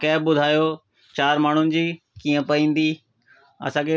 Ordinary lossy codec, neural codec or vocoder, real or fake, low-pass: none; none; real; none